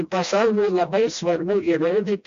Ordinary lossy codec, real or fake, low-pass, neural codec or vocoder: MP3, 64 kbps; fake; 7.2 kHz; codec, 16 kHz, 1 kbps, FreqCodec, smaller model